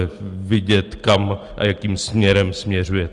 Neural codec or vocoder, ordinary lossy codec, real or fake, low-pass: none; Opus, 24 kbps; real; 10.8 kHz